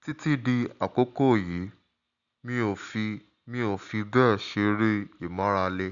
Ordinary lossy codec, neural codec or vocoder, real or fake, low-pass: none; none; real; 7.2 kHz